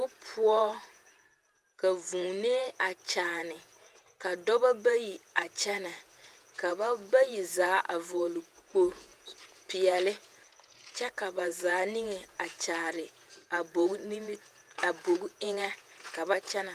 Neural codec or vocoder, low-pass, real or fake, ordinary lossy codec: vocoder, 48 kHz, 128 mel bands, Vocos; 14.4 kHz; fake; Opus, 32 kbps